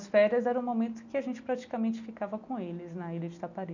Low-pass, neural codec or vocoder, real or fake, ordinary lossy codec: 7.2 kHz; none; real; none